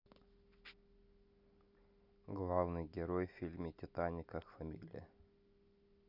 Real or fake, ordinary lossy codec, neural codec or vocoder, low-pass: real; none; none; 5.4 kHz